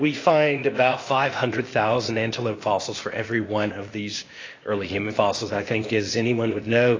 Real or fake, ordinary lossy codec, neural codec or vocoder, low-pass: fake; AAC, 32 kbps; codec, 16 kHz, 0.8 kbps, ZipCodec; 7.2 kHz